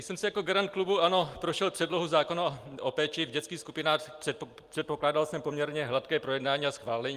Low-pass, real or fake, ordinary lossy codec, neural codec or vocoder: 14.4 kHz; real; Opus, 32 kbps; none